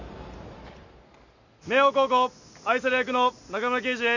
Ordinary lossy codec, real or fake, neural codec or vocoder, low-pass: none; real; none; 7.2 kHz